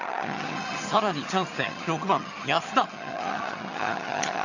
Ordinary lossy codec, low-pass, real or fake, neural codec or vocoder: none; 7.2 kHz; fake; vocoder, 22.05 kHz, 80 mel bands, HiFi-GAN